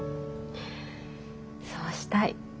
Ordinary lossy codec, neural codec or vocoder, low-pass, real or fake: none; none; none; real